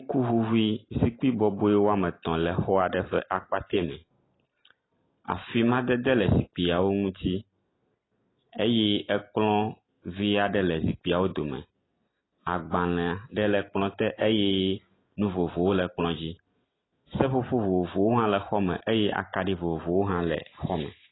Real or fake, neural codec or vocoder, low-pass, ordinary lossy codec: real; none; 7.2 kHz; AAC, 16 kbps